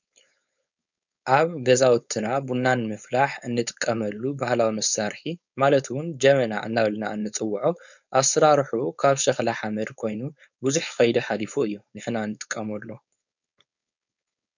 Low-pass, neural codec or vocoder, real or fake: 7.2 kHz; codec, 16 kHz, 4.8 kbps, FACodec; fake